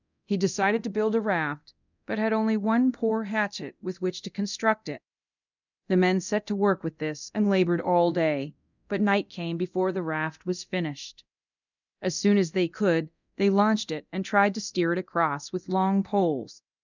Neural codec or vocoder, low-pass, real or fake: codec, 24 kHz, 0.9 kbps, DualCodec; 7.2 kHz; fake